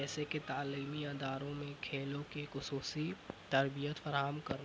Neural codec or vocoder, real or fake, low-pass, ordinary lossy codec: none; real; none; none